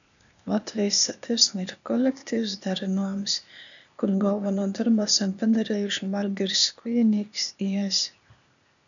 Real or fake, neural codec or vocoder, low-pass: fake; codec, 16 kHz, 0.8 kbps, ZipCodec; 7.2 kHz